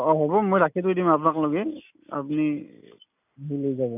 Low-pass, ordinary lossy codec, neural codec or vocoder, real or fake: 3.6 kHz; none; none; real